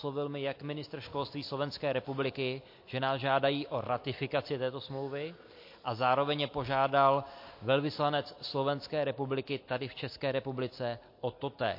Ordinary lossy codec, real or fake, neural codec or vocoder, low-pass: MP3, 32 kbps; real; none; 5.4 kHz